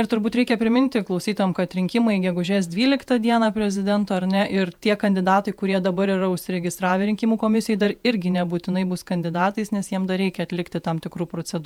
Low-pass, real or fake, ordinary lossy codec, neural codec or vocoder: 19.8 kHz; fake; MP3, 96 kbps; vocoder, 48 kHz, 128 mel bands, Vocos